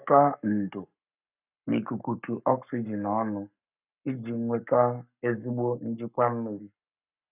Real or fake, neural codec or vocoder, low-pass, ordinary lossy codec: fake; codec, 44.1 kHz, 7.8 kbps, Pupu-Codec; 3.6 kHz; none